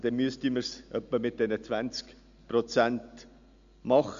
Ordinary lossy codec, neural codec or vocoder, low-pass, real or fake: MP3, 64 kbps; none; 7.2 kHz; real